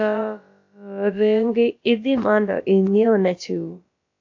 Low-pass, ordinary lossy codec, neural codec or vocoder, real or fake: 7.2 kHz; AAC, 48 kbps; codec, 16 kHz, about 1 kbps, DyCAST, with the encoder's durations; fake